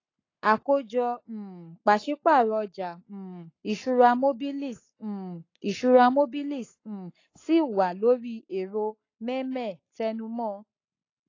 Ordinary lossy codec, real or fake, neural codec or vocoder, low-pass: AAC, 32 kbps; fake; codec, 44.1 kHz, 7.8 kbps, Pupu-Codec; 7.2 kHz